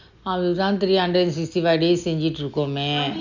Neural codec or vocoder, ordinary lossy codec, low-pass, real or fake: none; none; 7.2 kHz; real